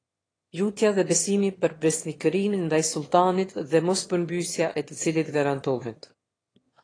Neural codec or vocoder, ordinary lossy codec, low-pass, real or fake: autoencoder, 22.05 kHz, a latent of 192 numbers a frame, VITS, trained on one speaker; AAC, 32 kbps; 9.9 kHz; fake